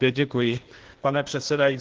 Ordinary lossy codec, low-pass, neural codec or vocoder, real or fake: Opus, 16 kbps; 7.2 kHz; codec, 16 kHz, 0.8 kbps, ZipCodec; fake